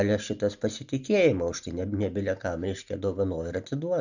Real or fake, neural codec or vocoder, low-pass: real; none; 7.2 kHz